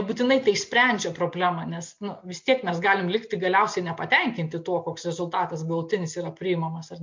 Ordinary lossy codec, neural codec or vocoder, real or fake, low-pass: MP3, 48 kbps; none; real; 7.2 kHz